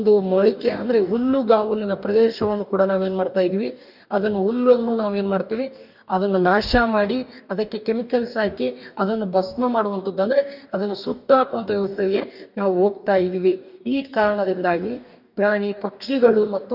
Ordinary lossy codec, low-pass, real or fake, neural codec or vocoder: none; 5.4 kHz; fake; codec, 44.1 kHz, 2.6 kbps, DAC